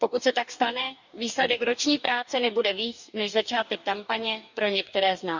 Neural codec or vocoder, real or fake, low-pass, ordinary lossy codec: codec, 44.1 kHz, 2.6 kbps, DAC; fake; 7.2 kHz; none